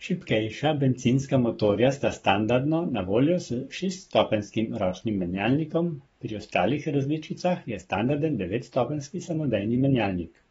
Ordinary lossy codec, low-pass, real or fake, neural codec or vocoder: AAC, 24 kbps; 19.8 kHz; fake; codec, 44.1 kHz, 7.8 kbps, Pupu-Codec